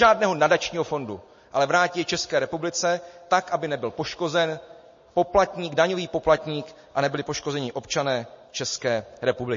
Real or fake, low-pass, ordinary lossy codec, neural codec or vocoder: real; 7.2 kHz; MP3, 32 kbps; none